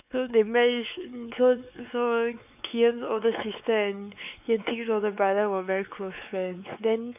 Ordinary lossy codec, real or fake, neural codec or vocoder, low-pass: none; fake; codec, 16 kHz, 4 kbps, X-Codec, WavLM features, trained on Multilingual LibriSpeech; 3.6 kHz